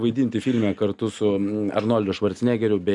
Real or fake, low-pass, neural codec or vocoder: real; 10.8 kHz; none